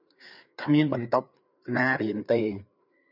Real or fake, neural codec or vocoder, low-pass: fake; codec, 16 kHz, 4 kbps, FreqCodec, larger model; 5.4 kHz